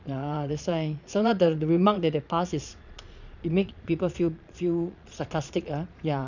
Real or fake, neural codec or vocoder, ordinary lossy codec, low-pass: fake; vocoder, 22.05 kHz, 80 mel bands, Vocos; none; 7.2 kHz